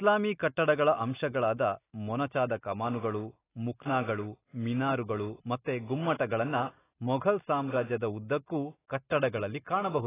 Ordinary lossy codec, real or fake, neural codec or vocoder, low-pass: AAC, 16 kbps; real; none; 3.6 kHz